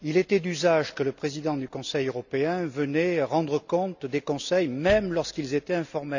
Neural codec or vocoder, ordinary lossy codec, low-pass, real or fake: none; none; 7.2 kHz; real